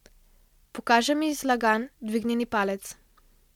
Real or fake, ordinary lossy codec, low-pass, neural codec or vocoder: real; MP3, 96 kbps; 19.8 kHz; none